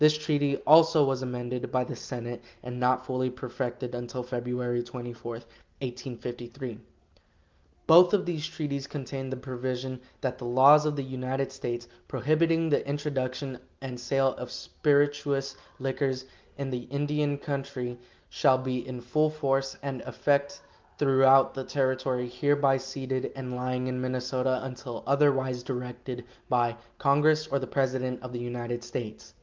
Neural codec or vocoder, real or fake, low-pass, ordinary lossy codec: none; real; 7.2 kHz; Opus, 32 kbps